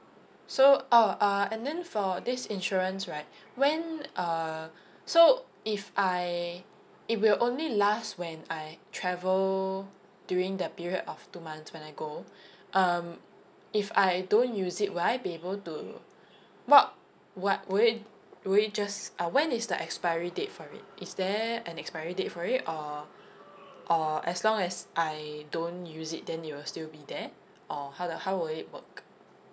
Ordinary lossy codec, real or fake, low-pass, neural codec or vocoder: none; real; none; none